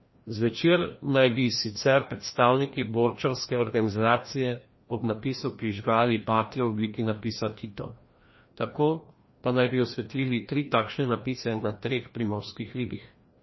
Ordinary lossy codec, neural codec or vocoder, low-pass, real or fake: MP3, 24 kbps; codec, 16 kHz, 1 kbps, FreqCodec, larger model; 7.2 kHz; fake